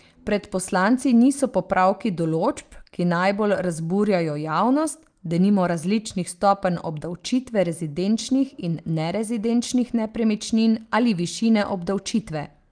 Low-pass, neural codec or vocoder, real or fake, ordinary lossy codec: 9.9 kHz; none; real; Opus, 32 kbps